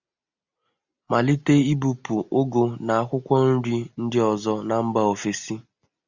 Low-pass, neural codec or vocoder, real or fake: 7.2 kHz; none; real